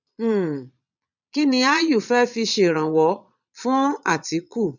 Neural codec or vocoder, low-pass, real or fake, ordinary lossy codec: vocoder, 22.05 kHz, 80 mel bands, Vocos; 7.2 kHz; fake; none